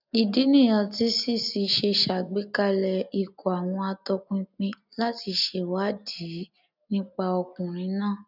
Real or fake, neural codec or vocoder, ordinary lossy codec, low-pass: real; none; none; 5.4 kHz